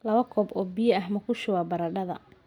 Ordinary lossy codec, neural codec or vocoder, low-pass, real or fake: none; none; 19.8 kHz; real